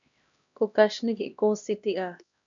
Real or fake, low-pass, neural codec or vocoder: fake; 7.2 kHz; codec, 16 kHz, 1 kbps, X-Codec, HuBERT features, trained on LibriSpeech